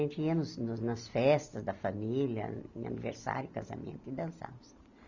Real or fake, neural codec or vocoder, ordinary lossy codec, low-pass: real; none; none; 7.2 kHz